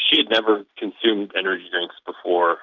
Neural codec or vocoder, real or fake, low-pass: none; real; 7.2 kHz